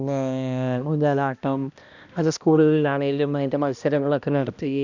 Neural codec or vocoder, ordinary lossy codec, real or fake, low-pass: codec, 16 kHz, 1 kbps, X-Codec, HuBERT features, trained on balanced general audio; none; fake; 7.2 kHz